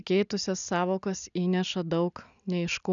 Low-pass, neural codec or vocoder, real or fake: 7.2 kHz; none; real